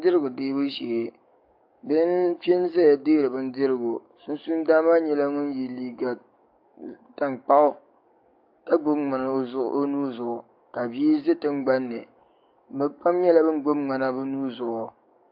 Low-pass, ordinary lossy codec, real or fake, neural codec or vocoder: 5.4 kHz; AAC, 48 kbps; fake; codec, 44.1 kHz, 7.8 kbps, DAC